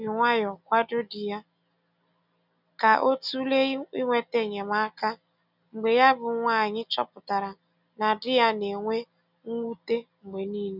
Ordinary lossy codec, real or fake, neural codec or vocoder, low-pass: none; real; none; 5.4 kHz